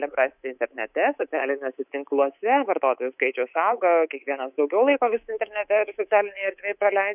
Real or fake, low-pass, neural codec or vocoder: fake; 3.6 kHz; codec, 24 kHz, 3.1 kbps, DualCodec